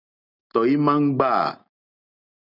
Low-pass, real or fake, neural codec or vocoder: 5.4 kHz; real; none